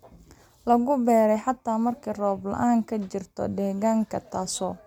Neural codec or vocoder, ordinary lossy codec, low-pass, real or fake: none; MP3, 96 kbps; 19.8 kHz; real